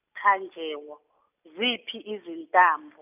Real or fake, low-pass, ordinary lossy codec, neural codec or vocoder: real; 3.6 kHz; none; none